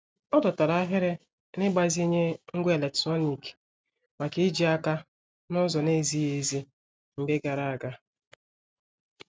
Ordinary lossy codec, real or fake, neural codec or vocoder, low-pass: none; real; none; none